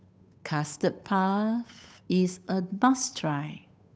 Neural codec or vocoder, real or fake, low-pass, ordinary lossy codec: codec, 16 kHz, 8 kbps, FunCodec, trained on Chinese and English, 25 frames a second; fake; none; none